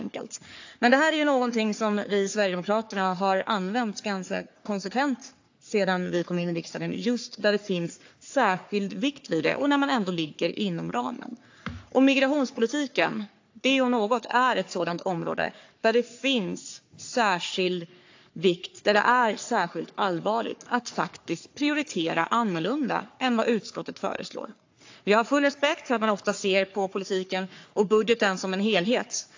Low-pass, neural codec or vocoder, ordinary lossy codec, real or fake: 7.2 kHz; codec, 44.1 kHz, 3.4 kbps, Pupu-Codec; AAC, 48 kbps; fake